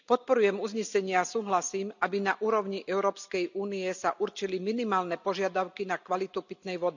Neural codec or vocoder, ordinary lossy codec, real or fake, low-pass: none; none; real; 7.2 kHz